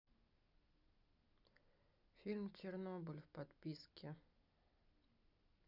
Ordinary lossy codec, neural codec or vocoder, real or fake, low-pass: none; none; real; 5.4 kHz